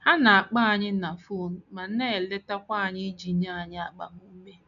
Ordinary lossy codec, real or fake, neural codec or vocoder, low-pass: none; real; none; 7.2 kHz